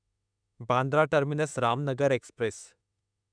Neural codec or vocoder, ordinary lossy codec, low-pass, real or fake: autoencoder, 48 kHz, 32 numbers a frame, DAC-VAE, trained on Japanese speech; none; 9.9 kHz; fake